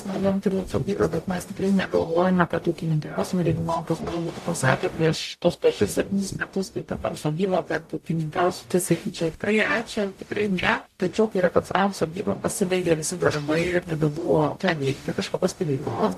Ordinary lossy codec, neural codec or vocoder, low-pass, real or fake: AAC, 64 kbps; codec, 44.1 kHz, 0.9 kbps, DAC; 14.4 kHz; fake